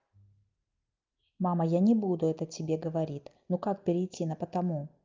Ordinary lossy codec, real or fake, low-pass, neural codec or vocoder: Opus, 24 kbps; real; 7.2 kHz; none